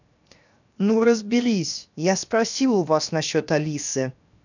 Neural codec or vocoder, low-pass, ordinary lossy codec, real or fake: codec, 16 kHz, 0.7 kbps, FocalCodec; 7.2 kHz; none; fake